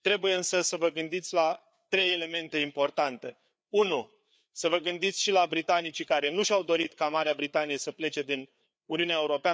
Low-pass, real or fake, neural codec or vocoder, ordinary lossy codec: none; fake; codec, 16 kHz, 4 kbps, FreqCodec, larger model; none